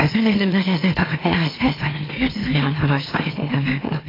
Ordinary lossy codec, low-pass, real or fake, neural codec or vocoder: AAC, 24 kbps; 5.4 kHz; fake; autoencoder, 44.1 kHz, a latent of 192 numbers a frame, MeloTTS